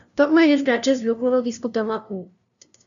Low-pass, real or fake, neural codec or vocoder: 7.2 kHz; fake; codec, 16 kHz, 0.5 kbps, FunCodec, trained on LibriTTS, 25 frames a second